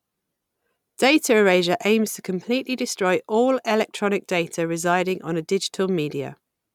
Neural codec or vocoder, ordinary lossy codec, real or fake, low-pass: none; none; real; 19.8 kHz